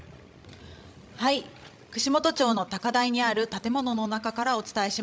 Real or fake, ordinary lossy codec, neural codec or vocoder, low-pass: fake; none; codec, 16 kHz, 16 kbps, FreqCodec, larger model; none